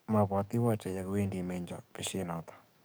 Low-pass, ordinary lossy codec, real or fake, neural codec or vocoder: none; none; fake; codec, 44.1 kHz, 7.8 kbps, DAC